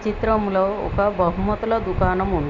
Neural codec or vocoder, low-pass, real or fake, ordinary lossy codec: none; 7.2 kHz; real; none